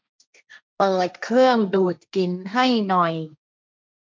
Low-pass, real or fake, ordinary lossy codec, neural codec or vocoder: none; fake; none; codec, 16 kHz, 1.1 kbps, Voila-Tokenizer